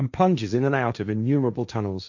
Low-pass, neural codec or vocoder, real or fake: 7.2 kHz; codec, 16 kHz, 1.1 kbps, Voila-Tokenizer; fake